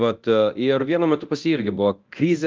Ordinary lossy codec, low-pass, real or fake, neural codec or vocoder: Opus, 32 kbps; 7.2 kHz; fake; codec, 24 kHz, 0.9 kbps, DualCodec